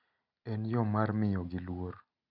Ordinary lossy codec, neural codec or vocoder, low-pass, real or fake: none; none; 5.4 kHz; real